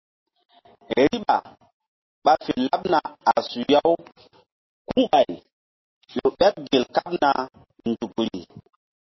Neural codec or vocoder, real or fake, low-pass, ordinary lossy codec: none; real; 7.2 kHz; MP3, 24 kbps